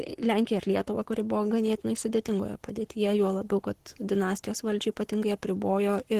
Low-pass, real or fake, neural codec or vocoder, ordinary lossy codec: 14.4 kHz; fake; codec, 44.1 kHz, 7.8 kbps, Pupu-Codec; Opus, 16 kbps